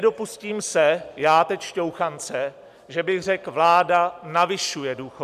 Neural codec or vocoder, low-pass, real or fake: none; 14.4 kHz; real